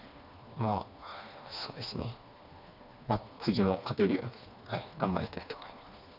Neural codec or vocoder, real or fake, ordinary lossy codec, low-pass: codec, 16 kHz, 2 kbps, FreqCodec, smaller model; fake; none; 5.4 kHz